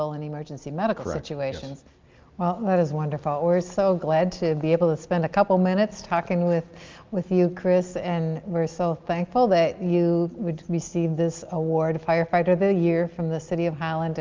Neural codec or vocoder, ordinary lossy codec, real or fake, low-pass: none; Opus, 24 kbps; real; 7.2 kHz